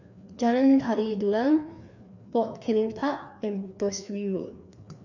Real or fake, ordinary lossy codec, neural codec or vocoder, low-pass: fake; none; codec, 16 kHz, 2 kbps, FreqCodec, larger model; 7.2 kHz